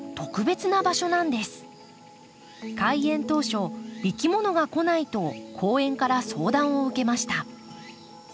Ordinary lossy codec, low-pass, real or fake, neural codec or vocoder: none; none; real; none